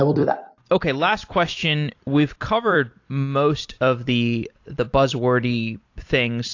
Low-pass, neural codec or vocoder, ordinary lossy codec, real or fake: 7.2 kHz; vocoder, 44.1 kHz, 128 mel bands every 256 samples, BigVGAN v2; AAC, 48 kbps; fake